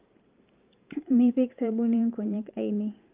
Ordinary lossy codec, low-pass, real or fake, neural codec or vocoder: Opus, 32 kbps; 3.6 kHz; fake; vocoder, 44.1 kHz, 128 mel bands every 512 samples, BigVGAN v2